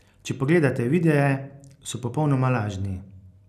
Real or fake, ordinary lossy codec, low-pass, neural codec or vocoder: real; none; 14.4 kHz; none